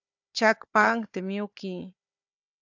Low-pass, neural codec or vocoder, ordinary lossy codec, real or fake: 7.2 kHz; codec, 16 kHz, 4 kbps, FunCodec, trained on Chinese and English, 50 frames a second; AAC, 48 kbps; fake